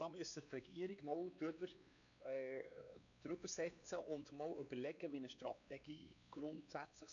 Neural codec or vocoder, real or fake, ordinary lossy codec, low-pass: codec, 16 kHz, 2 kbps, X-Codec, WavLM features, trained on Multilingual LibriSpeech; fake; none; 7.2 kHz